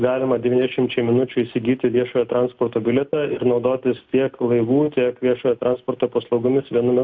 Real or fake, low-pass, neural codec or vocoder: real; 7.2 kHz; none